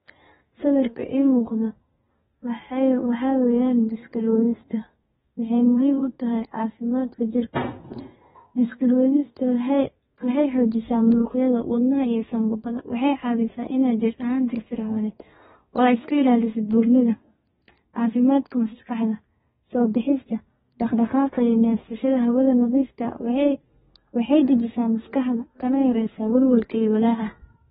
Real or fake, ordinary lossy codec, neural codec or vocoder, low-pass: fake; AAC, 16 kbps; codec, 32 kHz, 1.9 kbps, SNAC; 14.4 kHz